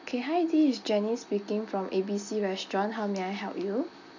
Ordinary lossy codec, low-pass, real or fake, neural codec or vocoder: none; 7.2 kHz; real; none